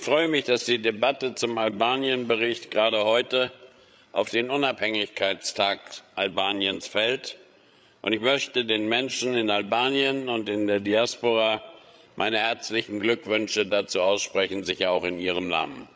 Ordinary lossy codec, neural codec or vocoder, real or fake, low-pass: none; codec, 16 kHz, 8 kbps, FreqCodec, larger model; fake; none